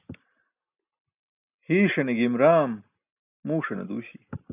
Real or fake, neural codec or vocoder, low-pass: real; none; 3.6 kHz